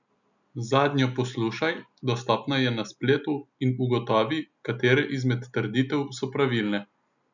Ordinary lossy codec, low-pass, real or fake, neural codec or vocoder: none; 7.2 kHz; real; none